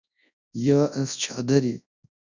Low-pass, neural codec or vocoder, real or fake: 7.2 kHz; codec, 24 kHz, 0.9 kbps, WavTokenizer, large speech release; fake